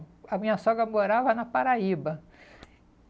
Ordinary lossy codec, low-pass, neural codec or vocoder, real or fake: none; none; none; real